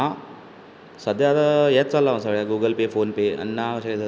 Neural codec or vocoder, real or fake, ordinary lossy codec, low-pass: none; real; none; none